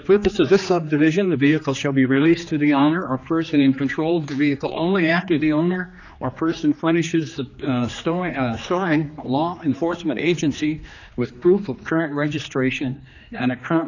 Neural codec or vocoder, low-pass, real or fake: codec, 16 kHz, 2 kbps, X-Codec, HuBERT features, trained on general audio; 7.2 kHz; fake